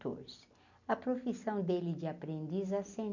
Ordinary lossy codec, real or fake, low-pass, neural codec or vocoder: none; real; 7.2 kHz; none